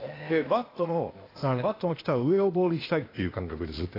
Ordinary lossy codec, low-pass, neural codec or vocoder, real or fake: AAC, 24 kbps; 5.4 kHz; codec, 16 kHz, 0.8 kbps, ZipCodec; fake